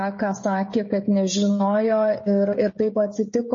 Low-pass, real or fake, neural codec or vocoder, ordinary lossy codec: 7.2 kHz; fake; codec, 16 kHz, 16 kbps, FreqCodec, smaller model; MP3, 32 kbps